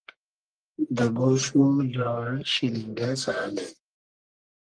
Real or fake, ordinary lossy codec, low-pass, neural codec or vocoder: fake; Opus, 24 kbps; 9.9 kHz; codec, 44.1 kHz, 1.7 kbps, Pupu-Codec